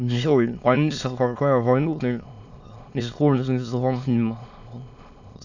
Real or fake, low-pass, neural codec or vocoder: fake; 7.2 kHz; autoencoder, 22.05 kHz, a latent of 192 numbers a frame, VITS, trained on many speakers